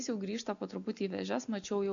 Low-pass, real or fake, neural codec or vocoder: 7.2 kHz; real; none